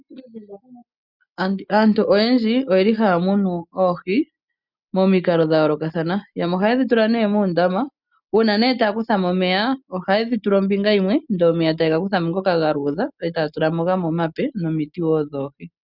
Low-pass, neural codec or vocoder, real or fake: 5.4 kHz; none; real